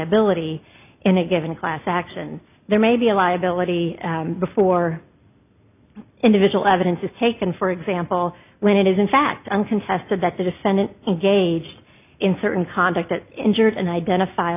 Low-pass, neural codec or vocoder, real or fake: 3.6 kHz; none; real